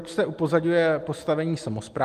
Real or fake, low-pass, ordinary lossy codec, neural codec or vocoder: real; 14.4 kHz; Opus, 24 kbps; none